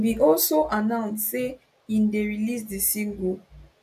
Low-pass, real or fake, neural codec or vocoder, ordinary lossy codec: 14.4 kHz; real; none; MP3, 96 kbps